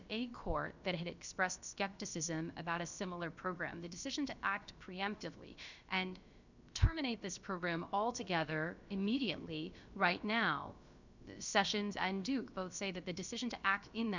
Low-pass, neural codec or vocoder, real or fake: 7.2 kHz; codec, 16 kHz, about 1 kbps, DyCAST, with the encoder's durations; fake